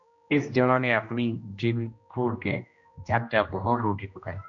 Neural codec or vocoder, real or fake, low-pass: codec, 16 kHz, 1 kbps, X-Codec, HuBERT features, trained on general audio; fake; 7.2 kHz